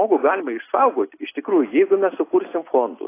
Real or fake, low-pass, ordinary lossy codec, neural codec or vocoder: real; 3.6 kHz; AAC, 24 kbps; none